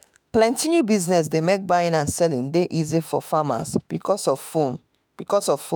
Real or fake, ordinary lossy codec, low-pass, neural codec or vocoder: fake; none; none; autoencoder, 48 kHz, 32 numbers a frame, DAC-VAE, trained on Japanese speech